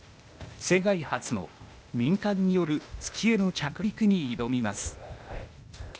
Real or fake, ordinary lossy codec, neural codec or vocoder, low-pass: fake; none; codec, 16 kHz, 0.8 kbps, ZipCodec; none